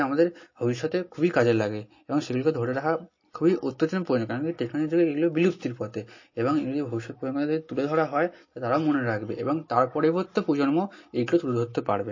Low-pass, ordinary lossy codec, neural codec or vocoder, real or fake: 7.2 kHz; MP3, 32 kbps; none; real